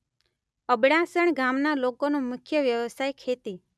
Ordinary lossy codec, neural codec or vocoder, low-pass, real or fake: none; none; none; real